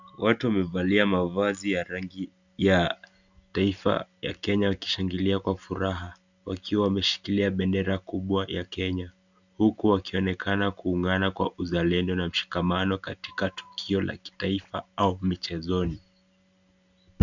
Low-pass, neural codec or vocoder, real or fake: 7.2 kHz; none; real